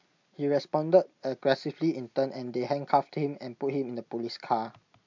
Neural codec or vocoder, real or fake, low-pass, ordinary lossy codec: none; real; 7.2 kHz; MP3, 64 kbps